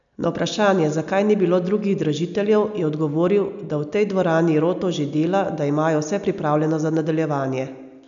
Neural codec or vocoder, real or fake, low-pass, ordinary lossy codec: none; real; 7.2 kHz; none